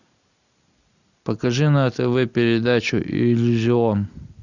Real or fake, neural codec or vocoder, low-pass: real; none; 7.2 kHz